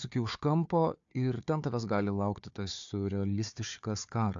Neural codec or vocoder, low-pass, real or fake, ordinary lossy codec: codec, 16 kHz, 4 kbps, FunCodec, trained on Chinese and English, 50 frames a second; 7.2 kHz; fake; AAC, 64 kbps